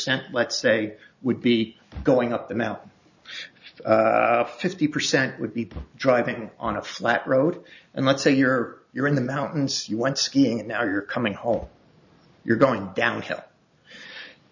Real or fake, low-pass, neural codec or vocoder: real; 7.2 kHz; none